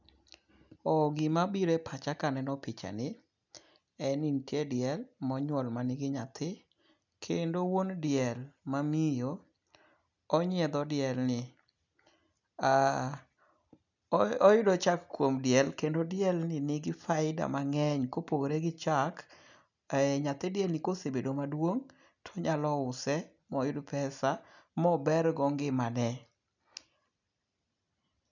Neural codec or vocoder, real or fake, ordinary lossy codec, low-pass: none; real; none; 7.2 kHz